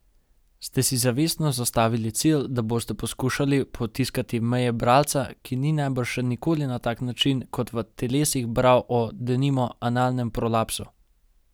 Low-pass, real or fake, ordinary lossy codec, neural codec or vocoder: none; real; none; none